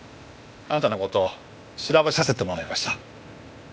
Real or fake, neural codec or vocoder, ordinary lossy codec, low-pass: fake; codec, 16 kHz, 0.8 kbps, ZipCodec; none; none